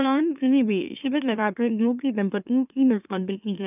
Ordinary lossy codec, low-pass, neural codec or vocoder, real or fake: none; 3.6 kHz; autoencoder, 44.1 kHz, a latent of 192 numbers a frame, MeloTTS; fake